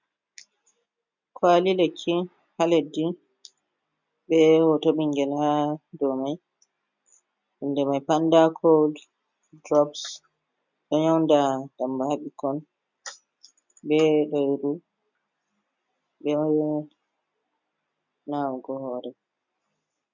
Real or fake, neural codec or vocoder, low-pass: real; none; 7.2 kHz